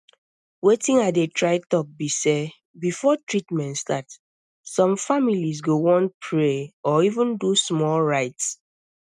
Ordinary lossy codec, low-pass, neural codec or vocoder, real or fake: none; none; none; real